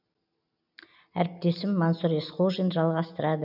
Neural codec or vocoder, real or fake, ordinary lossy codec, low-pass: none; real; none; 5.4 kHz